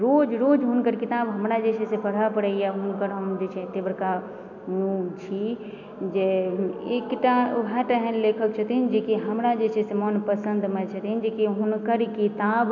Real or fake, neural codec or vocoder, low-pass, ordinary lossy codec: real; none; 7.2 kHz; none